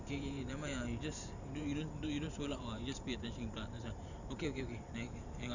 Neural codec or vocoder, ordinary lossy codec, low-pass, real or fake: vocoder, 44.1 kHz, 128 mel bands every 512 samples, BigVGAN v2; none; 7.2 kHz; fake